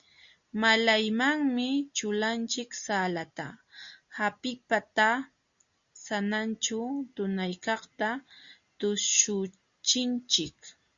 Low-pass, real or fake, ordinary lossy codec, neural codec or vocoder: 7.2 kHz; real; Opus, 64 kbps; none